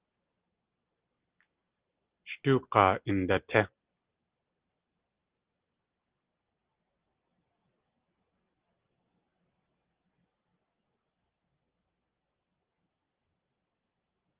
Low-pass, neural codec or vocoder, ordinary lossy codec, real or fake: 3.6 kHz; none; Opus, 32 kbps; real